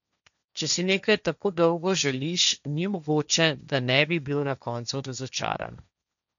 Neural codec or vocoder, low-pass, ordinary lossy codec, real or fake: codec, 16 kHz, 1.1 kbps, Voila-Tokenizer; 7.2 kHz; MP3, 96 kbps; fake